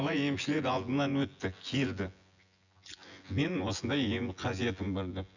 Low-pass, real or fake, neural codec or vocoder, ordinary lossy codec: 7.2 kHz; fake; vocoder, 24 kHz, 100 mel bands, Vocos; none